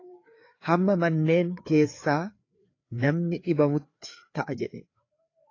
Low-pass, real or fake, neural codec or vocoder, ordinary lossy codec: 7.2 kHz; fake; codec, 16 kHz, 4 kbps, FreqCodec, larger model; AAC, 32 kbps